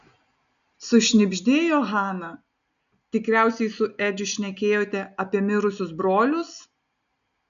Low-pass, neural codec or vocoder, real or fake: 7.2 kHz; none; real